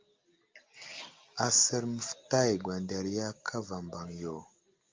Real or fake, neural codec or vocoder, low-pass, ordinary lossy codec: real; none; 7.2 kHz; Opus, 24 kbps